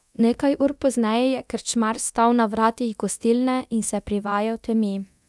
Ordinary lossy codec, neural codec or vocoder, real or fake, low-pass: none; codec, 24 kHz, 0.9 kbps, DualCodec; fake; none